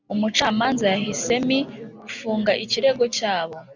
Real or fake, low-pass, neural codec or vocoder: real; 7.2 kHz; none